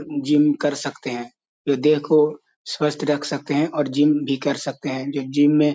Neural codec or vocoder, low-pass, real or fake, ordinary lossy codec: none; none; real; none